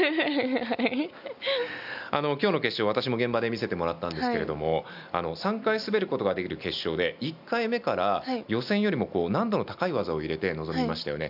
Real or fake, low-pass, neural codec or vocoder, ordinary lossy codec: real; 5.4 kHz; none; none